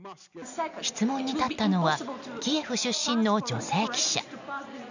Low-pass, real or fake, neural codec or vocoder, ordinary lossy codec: 7.2 kHz; real; none; none